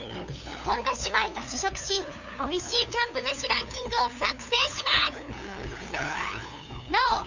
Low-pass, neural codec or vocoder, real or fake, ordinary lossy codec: 7.2 kHz; codec, 16 kHz, 2 kbps, FunCodec, trained on LibriTTS, 25 frames a second; fake; none